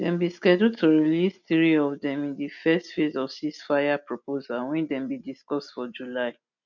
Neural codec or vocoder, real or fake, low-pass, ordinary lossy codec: none; real; 7.2 kHz; none